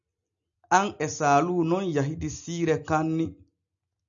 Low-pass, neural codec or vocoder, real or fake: 7.2 kHz; none; real